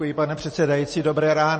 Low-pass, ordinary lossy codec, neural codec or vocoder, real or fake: 10.8 kHz; MP3, 32 kbps; none; real